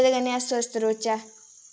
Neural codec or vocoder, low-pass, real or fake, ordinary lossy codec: none; none; real; none